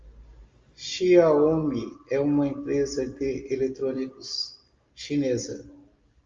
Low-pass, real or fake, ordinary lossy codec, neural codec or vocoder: 7.2 kHz; real; Opus, 32 kbps; none